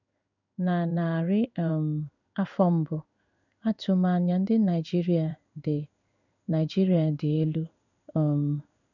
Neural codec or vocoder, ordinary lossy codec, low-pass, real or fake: codec, 16 kHz in and 24 kHz out, 1 kbps, XY-Tokenizer; none; 7.2 kHz; fake